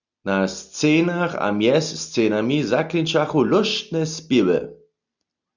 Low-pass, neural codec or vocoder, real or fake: 7.2 kHz; none; real